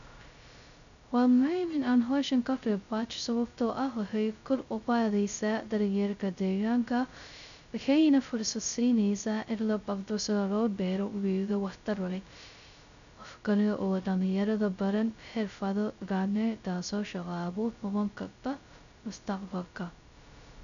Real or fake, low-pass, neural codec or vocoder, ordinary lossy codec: fake; 7.2 kHz; codec, 16 kHz, 0.2 kbps, FocalCodec; none